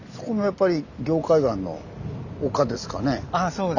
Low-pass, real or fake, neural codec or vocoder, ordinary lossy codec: 7.2 kHz; real; none; none